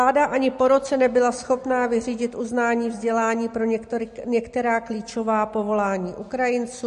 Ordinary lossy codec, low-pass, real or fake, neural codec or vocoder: MP3, 48 kbps; 14.4 kHz; real; none